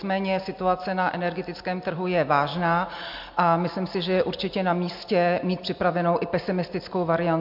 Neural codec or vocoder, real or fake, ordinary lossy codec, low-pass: none; real; MP3, 48 kbps; 5.4 kHz